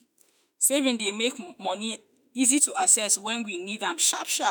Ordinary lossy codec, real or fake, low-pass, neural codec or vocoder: none; fake; none; autoencoder, 48 kHz, 32 numbers a frame, DAC-VAE, trained on Japanese speech